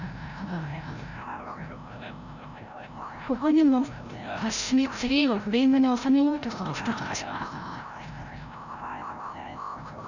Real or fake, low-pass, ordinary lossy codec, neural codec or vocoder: fake; 7.2 kHz; none; codec, 16 kHz, 0.5 kbps, FreqCodec, larger model